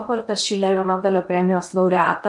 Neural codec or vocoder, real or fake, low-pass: codec, 16 kHz in and 24 kHz out, 0.8 kbps, FocalCodec, streaming, 65536 codes; fake; 10.8 kHz